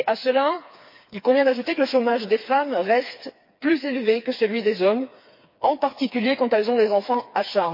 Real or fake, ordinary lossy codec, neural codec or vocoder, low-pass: fake; MP3, 32 kbps; codec, 16 kHz, 4 kbps, FreqCodec, smaller model; 5.4 kHz